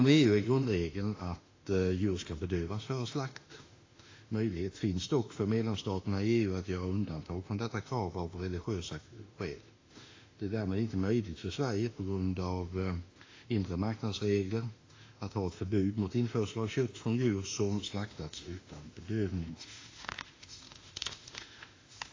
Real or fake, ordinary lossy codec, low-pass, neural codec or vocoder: fake; AAC, 32 kbps; 7.2 kHz; autoencoder, 48 kHz, 32 numbers a frame, DAC-VAE, trained on Japanese speech